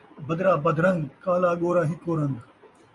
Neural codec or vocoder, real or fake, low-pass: none; real; 10.8 kHz